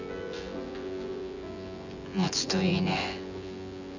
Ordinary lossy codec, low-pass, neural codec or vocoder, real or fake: none; 7.2 kHz; vocoder, 24 kHz, 100 mel bands, Vocos; fake